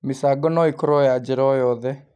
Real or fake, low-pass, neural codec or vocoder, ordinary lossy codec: real; none; none; none